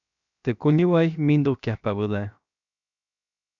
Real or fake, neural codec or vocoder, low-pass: fake; codec, 16 kHz, 0.7 kbps, FocalCodec; 7.2 kHz